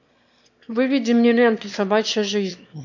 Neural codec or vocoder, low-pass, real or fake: autoencoder, 22.05 kHz, a latent of 192 numbers a frame, VITS, trained on one speaker; 7.2 kHz; fake